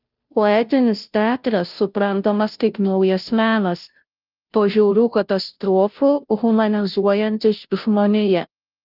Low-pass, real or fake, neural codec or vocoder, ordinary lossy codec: 5.4 kHz; fake; codec, 16 kHz, 0.5 kbps, FunCodec, trained on Chinese and English, 25 frames a second; Opus, 24 kbps